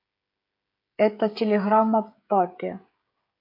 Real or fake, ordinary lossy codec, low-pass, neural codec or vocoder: fake; AAC, 32 kbps; 5.4 kHz; codec, 16 kHz, 16 kbps, FreqCodec, smaller model